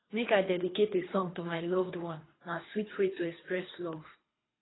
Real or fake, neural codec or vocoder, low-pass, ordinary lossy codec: fake; codec, 24 kHz, 3 kbps, HILCodec; 7.2 kHz; AAC, 16 kbps